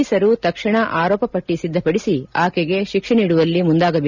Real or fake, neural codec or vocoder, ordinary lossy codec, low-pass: real; none; none; 7.2 kHz